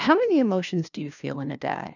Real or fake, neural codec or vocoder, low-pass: fake; codec, 16 kHz, 1 kbps, FunCodec, trained on LibriTTS, 50 frames a second; 7.2 kHz